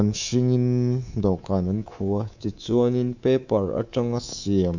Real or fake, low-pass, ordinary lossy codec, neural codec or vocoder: fake; 7.2 kHz; none; codec, 24 kHz, 3.1 kbps, DualCodec